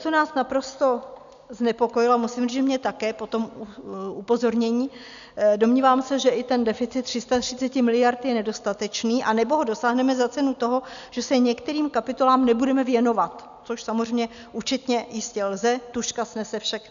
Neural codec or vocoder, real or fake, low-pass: none; real; 7.2 kHz